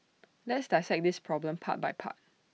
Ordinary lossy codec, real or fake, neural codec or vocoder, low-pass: none; real; none; none